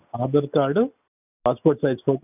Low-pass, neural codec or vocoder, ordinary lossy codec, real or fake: 3.6 kHz; none; none; real